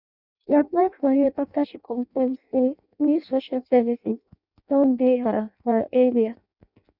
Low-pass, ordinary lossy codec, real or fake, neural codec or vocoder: 5.4 kHz; none; fake; codec, 16 kHz in and 24 kHz out, 0.6 kbps, FireRedTTS-2 codec